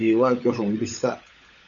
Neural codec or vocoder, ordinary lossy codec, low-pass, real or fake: codec, 16 kHz, 16 kbps, FunCodec, trained on LibriTTS, 50 frames a second; MP3, 64 kbps; 7.2 kHz; fake